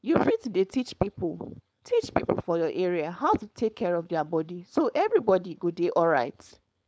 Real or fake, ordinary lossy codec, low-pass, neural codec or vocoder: fake; none; none; codec, 16 kHz, 4.8 kbps, FACodec